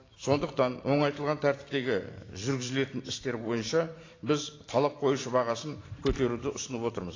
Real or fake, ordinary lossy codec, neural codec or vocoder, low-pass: fake; AAC, 32 kbps; vocoder, 44.1 kHz, 128 mel bands every 512 samples, BigVGAN v2; 7.2 kHz